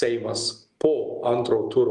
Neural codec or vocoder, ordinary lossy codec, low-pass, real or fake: none; Opus, 32 kbps; 10.8 kHz; real